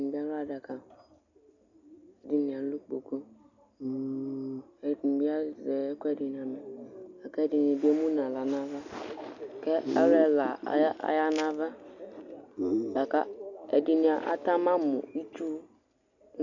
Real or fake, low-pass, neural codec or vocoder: real; 7.2 kHz; none